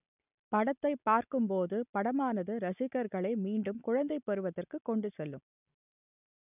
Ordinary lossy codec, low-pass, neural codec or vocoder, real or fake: none; 3.6 kHz; none; real